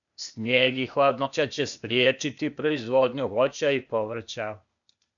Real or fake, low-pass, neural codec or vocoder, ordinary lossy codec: fake; 7.2 kHz; codec, 16 kHz, 0.8 kbps, ZipCodec; MP3, 96 kbps